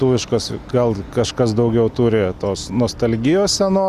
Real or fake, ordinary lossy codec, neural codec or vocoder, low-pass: real; Opus, 64 kbps; none; 14.4 kHz